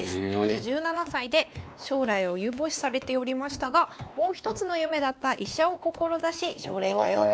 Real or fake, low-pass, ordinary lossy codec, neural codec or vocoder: fake; none; none; codec, 16 kHz, 2 kbps, X-Codec, WavLM features, trained on Multilingual LibriSpeech